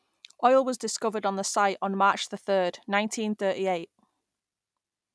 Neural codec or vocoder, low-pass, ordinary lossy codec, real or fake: none; none; none; real